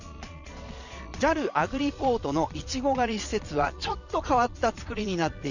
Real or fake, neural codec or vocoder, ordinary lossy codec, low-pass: fake; vocoder, 22.05 kHz, 80 mel bands, Vocos; none; 7.2 kHz